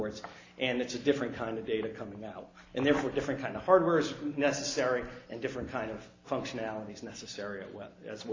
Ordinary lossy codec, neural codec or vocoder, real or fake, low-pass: AAC, 32 kbps; none; real; 7.2 kHz